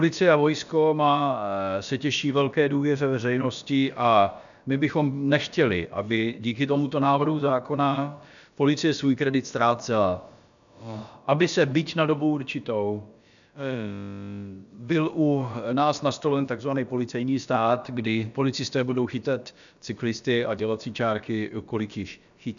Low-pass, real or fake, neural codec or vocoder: 7.2 kHz; fake; codec, 16 kHz, about 1 kbps, DyCAST, with the encoder's durations